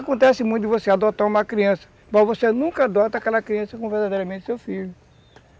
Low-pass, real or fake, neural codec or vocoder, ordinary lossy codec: none; real; none; none